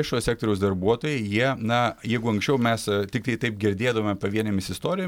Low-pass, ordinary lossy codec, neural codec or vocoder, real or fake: 19.8 kHz; MP3, 96 kbps; none; real